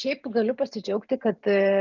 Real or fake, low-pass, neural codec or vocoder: fake; 7.2 kHz; vocoder, 44.1 kHz, 128 mel bands every 512 samples, BigVGAN v2